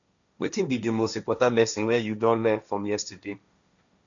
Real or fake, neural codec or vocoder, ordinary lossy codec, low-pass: fake; codec, 16 kHz, 1.1 kbps, Voila-Tokenizer; none; 7.2 kHz